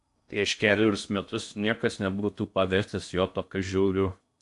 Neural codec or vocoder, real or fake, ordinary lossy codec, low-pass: codec, 16 kHz in and 24 kHz out, 0.6 kbps, FocalCodec, streaming, 2048 codes; fake; AAC, 64 kbps; 10.8 kHz